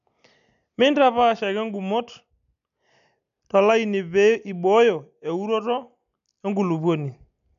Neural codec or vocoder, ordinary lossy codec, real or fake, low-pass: none; none; real; 7.2 kHz